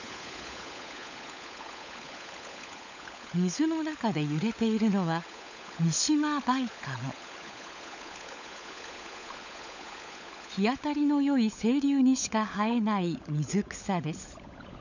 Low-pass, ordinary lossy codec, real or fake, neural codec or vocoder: 7.2 kHz; none; fake; codec, 16 kHz, 16 kbps, FunCodec, trained on LibriTTS, 50 frames a second